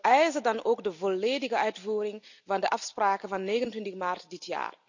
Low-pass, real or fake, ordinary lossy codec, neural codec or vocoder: 7.2 kHz; real; none; none